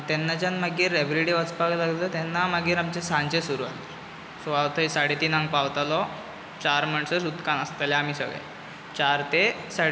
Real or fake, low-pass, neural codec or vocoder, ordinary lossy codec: real; none; none; none